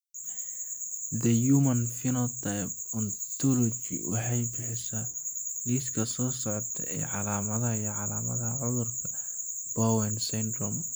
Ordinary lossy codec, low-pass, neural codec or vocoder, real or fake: none; none; none; real